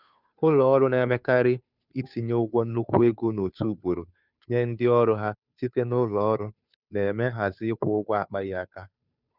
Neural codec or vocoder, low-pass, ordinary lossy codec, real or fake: codec, 16 kHz, 2 kbps, FunCodec, trained on Chinese and English, 25 frames a second; 5.4 kHz; none; fake